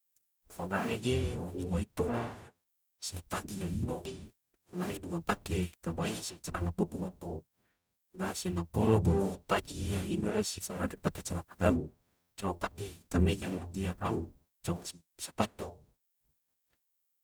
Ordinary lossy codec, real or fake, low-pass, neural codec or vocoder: none; fake; none; codec, 44.1 kHz, 0.9 kbps, DAC